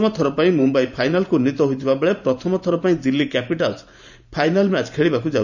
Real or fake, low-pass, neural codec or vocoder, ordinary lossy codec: fake; 7.2 kHz; vocoder, 44.1 kHz, 128 mel bands every 512 samples, BigVGAN v2; none